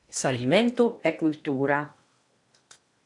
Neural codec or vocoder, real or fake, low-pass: codec, 16 kHz in and 24 kHz out, 0.8 kbps, FocalCodec, streaming, 65536 codes; fake; 10.8 kHz